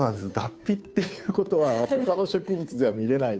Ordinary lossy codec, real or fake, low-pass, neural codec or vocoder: none; fake; none; codec, 16 kHz, 2 kbps, FunCodec, trained on Chinese and English, 25 frames a second